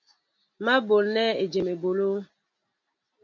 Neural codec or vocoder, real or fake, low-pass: none; real; 7.2 kHz